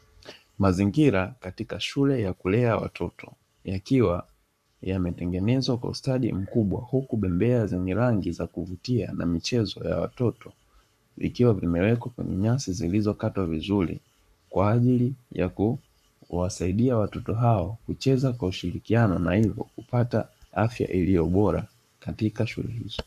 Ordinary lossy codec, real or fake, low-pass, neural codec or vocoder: MP3, 96 kbps; fake; 14.4 kHz; codec, 44.1 kHz, 7.8 kbps, Pupu-Codec